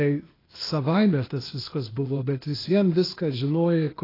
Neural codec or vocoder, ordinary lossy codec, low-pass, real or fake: codec, 16 kHz, 0.8 kbps, ZipCodec; AAC, 24 kbps; 5.4 kHz; fake